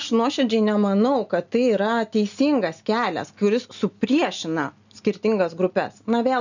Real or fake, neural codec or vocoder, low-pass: real; none; 7.2 kHz